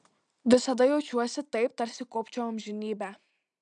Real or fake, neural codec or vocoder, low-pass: real; none; 9.9 kHz